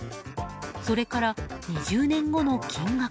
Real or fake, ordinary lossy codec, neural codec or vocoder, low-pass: real; none; none; none